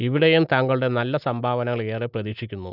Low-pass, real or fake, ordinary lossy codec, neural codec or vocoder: 5.4 kHz; real; none; none